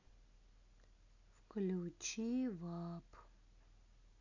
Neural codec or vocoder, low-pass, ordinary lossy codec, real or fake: none; 7.2 kHz; AAC, 48 kbps; real